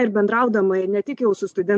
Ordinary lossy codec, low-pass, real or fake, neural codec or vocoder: MP3, 64 kbps; 10.8 kHz; real; none